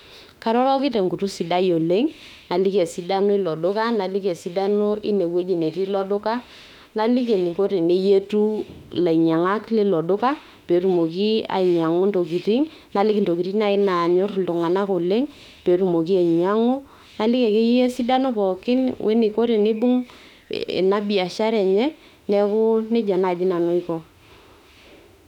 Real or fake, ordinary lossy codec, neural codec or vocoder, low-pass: fake; none; autoencoder, 48 kHz, 32 numbers a frame, DAC-VAE, trained on Japanese speech; 19.8 kHz